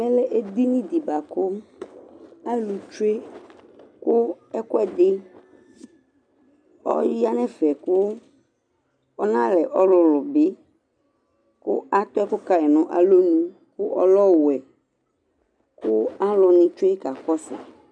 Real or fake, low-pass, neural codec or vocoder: real; 9.9 kHz; none